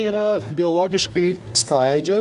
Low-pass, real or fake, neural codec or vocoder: 10.8 kHz; fake; codec, 24 kHz, 1 kbps, SNAC